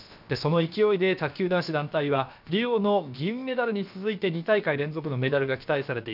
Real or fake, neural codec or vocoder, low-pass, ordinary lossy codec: fake; codec, 16 kHz, about 1 kbps, DyCAST, with the encoder's durations; 5.4 kHz; none